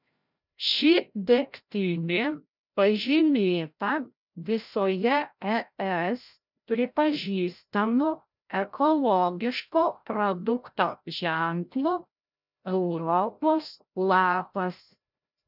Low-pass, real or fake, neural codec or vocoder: 5.4 kHz; fake; codec, 16 kHz, 0.5 kbps, FreqCodec, larger model